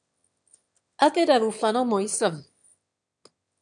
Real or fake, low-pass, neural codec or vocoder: fake; 9.9 kHz; autoencoder, 22.05 kHz, a latent of 192 numbers a frame, VITS, trained on one speaker